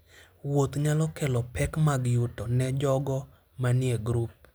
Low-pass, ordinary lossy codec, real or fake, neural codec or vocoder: none; none; real; none